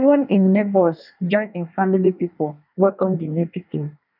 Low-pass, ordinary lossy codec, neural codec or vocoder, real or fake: 5.4 kHz; none; codec, 24 kHz, 1 kbps, SNAC; fake